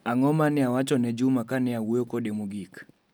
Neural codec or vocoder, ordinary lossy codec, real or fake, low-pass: none; none; real; none